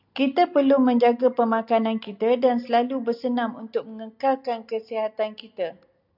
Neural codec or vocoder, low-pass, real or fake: none; 5.4 kHz; real